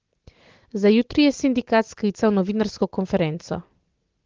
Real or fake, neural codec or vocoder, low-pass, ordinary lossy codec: real; none; 7.2 kHz; Opus, 16 kbps